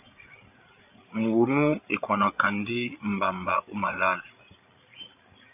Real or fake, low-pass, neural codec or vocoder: fake; 3.6 kHz; codec, 16 kHz, 8 kbps, FreqCodec, larger model